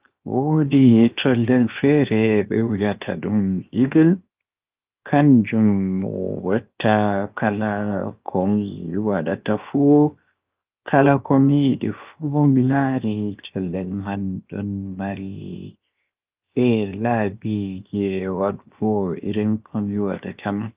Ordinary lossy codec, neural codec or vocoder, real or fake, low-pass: Opus, 32 kbps; codec, 16 kHz, 0.7 kbps, FocalCodec; fake; 3.6 kHz